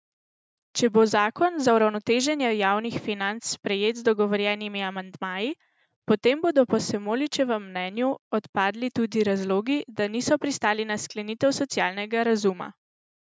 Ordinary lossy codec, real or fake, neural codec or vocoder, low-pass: none; real; none; none